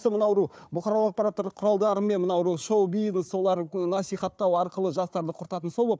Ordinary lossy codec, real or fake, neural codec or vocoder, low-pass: none; fake; codec, 16 kHz, 4 kbps, FunCodec, trained on Chinese and English, 50 frames a second; none